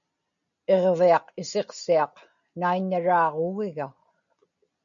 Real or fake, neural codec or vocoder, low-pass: real; none; 7.2 kHz